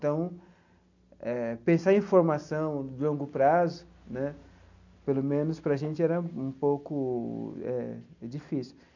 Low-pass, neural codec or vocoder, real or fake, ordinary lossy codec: 7.2 kHz; none; real; none